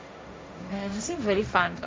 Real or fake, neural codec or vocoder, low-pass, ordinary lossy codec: fake; codec, 16 kHz, 1.1 kbps, Voila-Tokenizer; none; none